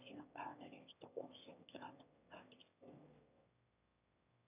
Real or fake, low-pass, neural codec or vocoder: fake; 3.6 kHz; autoencoder, 22.05 kHz, a latent of 192 numbers a frame, VITS, trained on one speaker